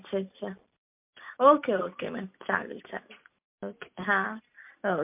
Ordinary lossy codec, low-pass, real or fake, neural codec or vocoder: MP3, 32 kbps; 3.6 kHz; real; none